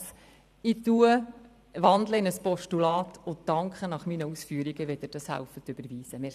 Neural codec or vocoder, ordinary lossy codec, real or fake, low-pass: vocoder, 44.1 kHz, 128 mel bands every 512 samples, BigVGAN v2; none; fake; 14.4 kHz